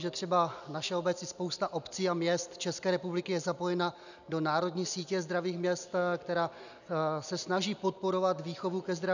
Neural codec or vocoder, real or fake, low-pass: none; real; 7.2 kHz